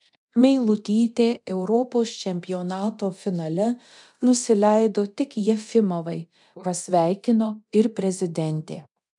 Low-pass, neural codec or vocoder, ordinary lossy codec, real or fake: 10.8 kHz; codec, 24 kHz, 0.9 kbps, DualCodec; MP3, 96 kbps; fake